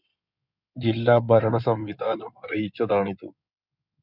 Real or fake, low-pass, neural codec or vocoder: fake; 5.4 kHz; codec, 16 kHz in and 24 kHz out, 2.2 kbps, FireRedTTS-2 codec